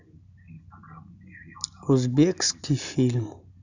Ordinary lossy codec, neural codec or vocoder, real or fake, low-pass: none; none; real; 7.2 kHz